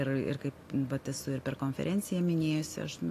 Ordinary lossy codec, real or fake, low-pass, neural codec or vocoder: AAC, 48 kbps; real; 14.4 kHz; none